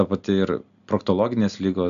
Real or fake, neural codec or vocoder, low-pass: real; none; 7.2 kHz